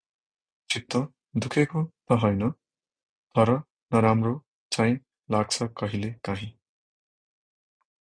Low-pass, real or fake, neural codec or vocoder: 9.9 kHz; real; none